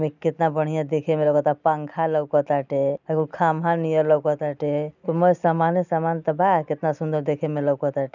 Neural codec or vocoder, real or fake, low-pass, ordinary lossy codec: none; real; 7.2 kHz; none